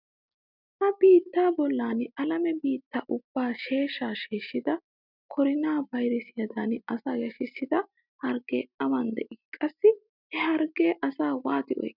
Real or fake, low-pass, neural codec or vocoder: real; 5.4 kHz; none